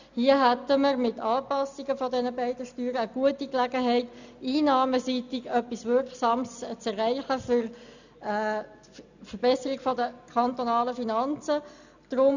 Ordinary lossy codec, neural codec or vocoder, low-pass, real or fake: none; none; 7.2 kHz; real